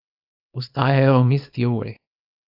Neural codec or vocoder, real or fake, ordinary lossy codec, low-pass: codec, 24 kHz, 0.9 kbps, WavTokenizer, small release; fake; AAC, 48 kbps; 5.4 kHz